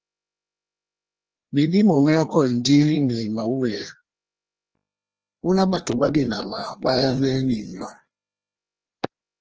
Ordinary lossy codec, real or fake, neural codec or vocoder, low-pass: Opus, 16 kbps; fake; codec, 16 kHz, 1 kbps, FreqCodec, larger model; 7.2 kHz